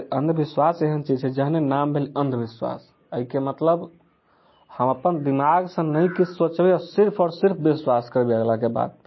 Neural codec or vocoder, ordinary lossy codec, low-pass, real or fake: none; MP3, 24 kbps; 7.2 kHz; real